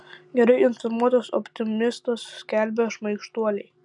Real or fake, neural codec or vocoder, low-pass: real; none; 10.8 kHz